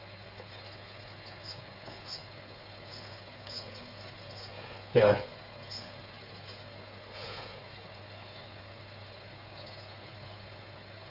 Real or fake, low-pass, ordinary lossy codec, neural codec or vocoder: fake; 5.4 kHz; Opus, 64 kbps; codec, 16 kHz, 8 kbps, FreqCodec, smaller model